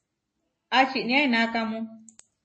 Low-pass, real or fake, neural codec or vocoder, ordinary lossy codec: 10.8 kHz; real; none; MP3, 32 kbps